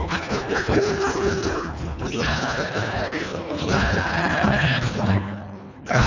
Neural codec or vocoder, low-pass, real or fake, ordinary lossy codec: codec, 24 kHz, 1.5 kbps, HILCodec; 7.2 kHz; fake; none